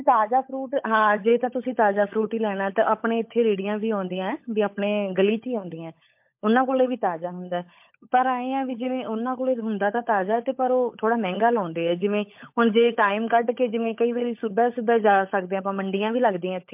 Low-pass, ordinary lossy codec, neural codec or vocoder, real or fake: 3.6 kHz; MP3, 32 kbps; codec, 16 kHz, 16 kbps, FunCodec, trained on LibriTTS, 50 frames a second; fake